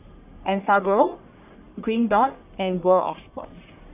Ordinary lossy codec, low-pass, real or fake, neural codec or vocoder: none; 3.6 kHz; fake; codec, 44.1 kHz, 1.7 kbps, Pupu-Codec